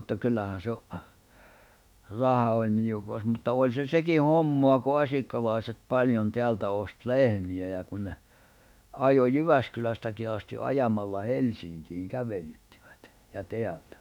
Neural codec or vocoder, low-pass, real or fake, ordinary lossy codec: autoencoder, 48 kHz, 32 numbers a frame, DAC-VAE, trained on Japanese speech; 19.8 kHz; fake; none